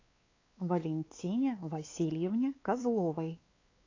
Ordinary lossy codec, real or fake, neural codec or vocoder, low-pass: AAC, 32 kbps; fake; codec, 16 kHz, 4 kbps, X-Codec, WavLM features, trained on Multilingual LibriSpeech; 7.2 kHz